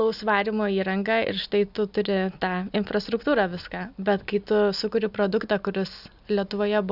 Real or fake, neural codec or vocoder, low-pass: real; none; 5.4 kHz